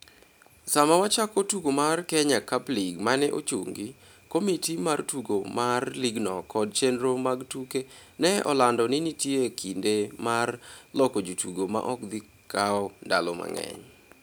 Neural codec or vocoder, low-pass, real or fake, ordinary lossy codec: none; none; real; none